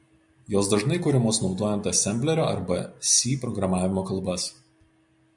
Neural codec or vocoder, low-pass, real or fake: none; 10.8 kHz; real